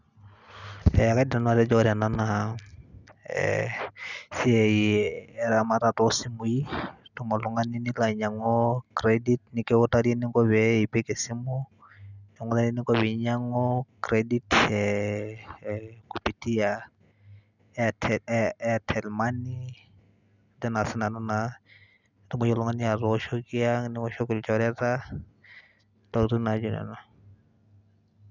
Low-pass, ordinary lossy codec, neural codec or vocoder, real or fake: 7.2 kHz; none; none; real